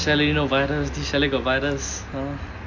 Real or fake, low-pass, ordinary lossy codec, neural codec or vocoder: real; 7.2 kHz; none; none